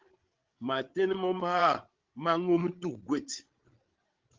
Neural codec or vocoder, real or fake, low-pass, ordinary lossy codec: codec, 16 kHz, 16 kbps, FreqCodec, larger model; fake; 7.2 kHz; Opus, 16 kbps